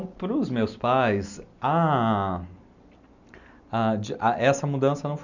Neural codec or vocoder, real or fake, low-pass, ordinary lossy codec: none; real; 7.2 kHz; none